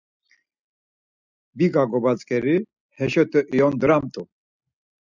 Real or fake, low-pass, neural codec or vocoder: real; 7.2 kHz; none